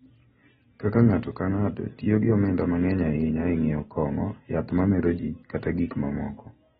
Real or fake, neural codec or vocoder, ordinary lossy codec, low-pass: real; none; AAC, 16 kbps; 19.8 kHz